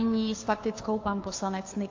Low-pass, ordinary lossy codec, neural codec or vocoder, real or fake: 7.2 kHz; AAC, 48 kbps; codec, 16 kHz, 2 kbps, FunCodec, trained on Chinese and English, 25 frames a second; fake